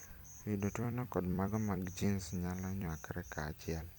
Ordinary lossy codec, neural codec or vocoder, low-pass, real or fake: none; vocoder, 44.1 kHz, 128 mel bands every 256 samples, BigVGAN v2; none; fake